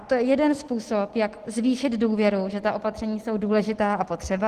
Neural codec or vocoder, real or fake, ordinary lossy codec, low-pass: none; real; Opus, 16 kbps; 14.4 kHz